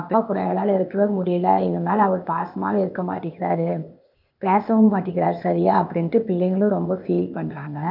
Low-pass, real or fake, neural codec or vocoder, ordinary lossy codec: 5.4 kHz; fake; codec, 16 kHz, 0.8 kbps, ZipCodec; none